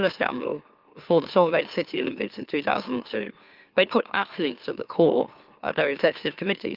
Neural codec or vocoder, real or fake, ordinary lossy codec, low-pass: autoencoder, 44.1 kHz, a latent of 192 numbers a frame, MeloTTS; fake; Opus, 32 kbps; 5.4 kHz